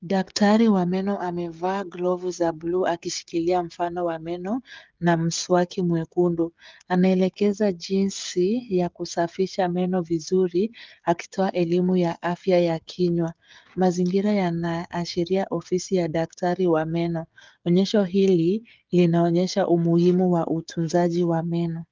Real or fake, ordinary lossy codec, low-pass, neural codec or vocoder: fake; Opus, 24 kbps; 7.2 kHz; codec, 16 kHz, 16 kbps, FreqCodec, smaller model